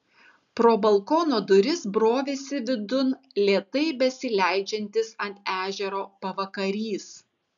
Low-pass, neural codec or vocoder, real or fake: 7.2 kHz; none; real